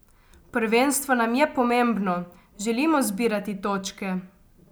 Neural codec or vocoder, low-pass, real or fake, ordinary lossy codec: none; none; real; none